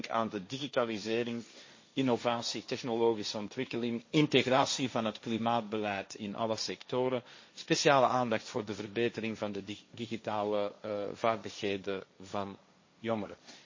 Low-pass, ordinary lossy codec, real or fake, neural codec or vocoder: 7.2 kHz; MP3, 32 kbps; fake; codec, 16 kHz, 1.1 kbps, Voila-Tokenizer